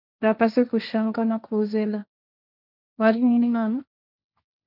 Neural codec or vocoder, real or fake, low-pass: codec, 16 kHz, 1.1 kbps, Voila-Tokenizer; fake; 5.4 kHz